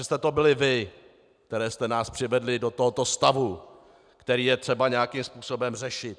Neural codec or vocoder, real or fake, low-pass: none; real; 9.9 kHz